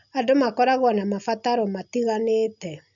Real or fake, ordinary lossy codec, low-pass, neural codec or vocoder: real; none; 7.2 kHz; none